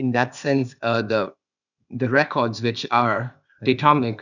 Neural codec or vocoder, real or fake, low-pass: codec, 16 kHz, 0.8 kbps, ZipCodec; fake; 7.2 kHz